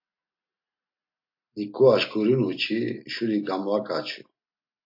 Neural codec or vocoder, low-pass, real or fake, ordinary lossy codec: none; 5.4 kHz; real; MP3, 32 kbps